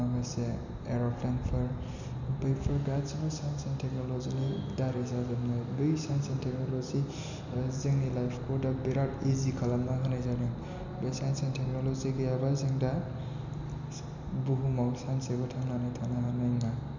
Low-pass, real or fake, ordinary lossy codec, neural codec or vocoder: 7.2 kHz; real; none; none